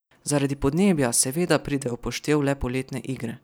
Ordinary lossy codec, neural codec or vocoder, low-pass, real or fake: none; none; none; real